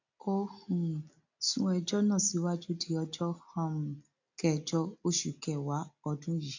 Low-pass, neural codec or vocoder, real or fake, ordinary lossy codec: 7.2 kHz; none; real; AAC, 48 kbps